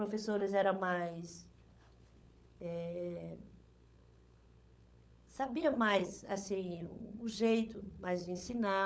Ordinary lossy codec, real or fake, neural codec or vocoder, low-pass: none; fake; codec, 16 kHz, 16 kbps, FunCodec, trained on LibriTTS, 50 frames a second; none